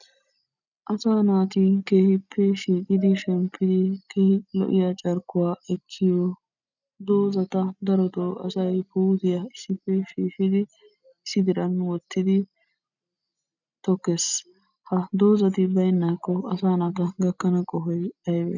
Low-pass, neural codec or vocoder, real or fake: 7.2 kHz; none; real